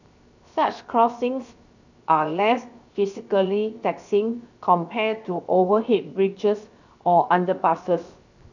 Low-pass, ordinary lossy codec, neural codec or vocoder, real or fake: 7.2 kHz; none; codec, 16 kHz, 0.7 kbps, FocalCodec; fake